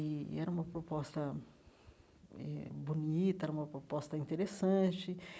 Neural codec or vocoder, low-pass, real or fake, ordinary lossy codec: none; none; real; none